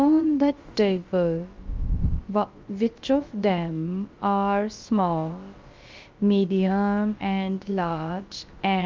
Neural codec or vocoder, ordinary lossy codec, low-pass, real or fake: codec, 16 kHz, about 1 kbps, DyCAST, with the encoder's durations; Opus, 32 kbps; 7.2 kHz; fake